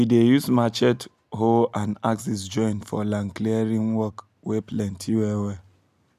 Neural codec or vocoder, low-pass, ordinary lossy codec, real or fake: none; 14.4 kHz; none; real